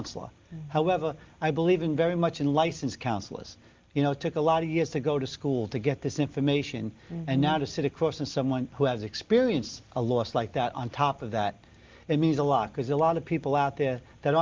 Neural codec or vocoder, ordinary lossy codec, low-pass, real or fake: none; Opus, 24 kbps; 7.2 kHz; real